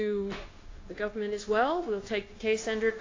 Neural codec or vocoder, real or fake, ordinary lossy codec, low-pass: codec, 16 kHz, 0.9 kbps, LongCat-Audio-Codec; fake; AAC, 32 kbps; 7.2 kHz